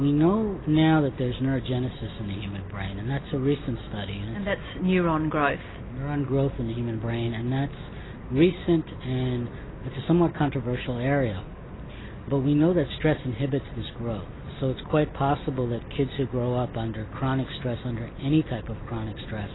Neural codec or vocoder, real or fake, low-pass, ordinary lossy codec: none; real; 7.2 kHz; AAC, 16 kbps